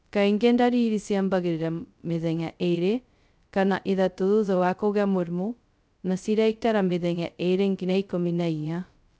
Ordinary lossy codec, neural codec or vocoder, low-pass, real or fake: none; codec, 16 kHz, 0.2 kbps, FocalCodec; none; fake